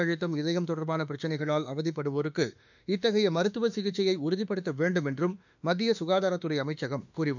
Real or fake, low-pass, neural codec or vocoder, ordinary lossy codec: fake; 7.2 kHz; autoencoder, 48 kHz, 32 numbers a frame, DAC-VAE, trained on Japanese speech; none